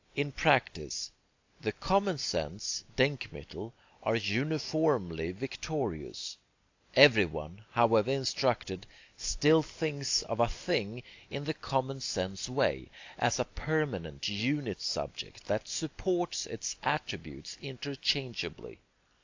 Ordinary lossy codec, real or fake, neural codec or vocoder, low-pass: AAC, 48 kbps; real; none; 7.2 kHz